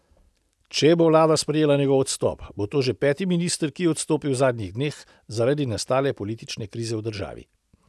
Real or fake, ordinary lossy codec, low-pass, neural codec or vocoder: real; none; none; none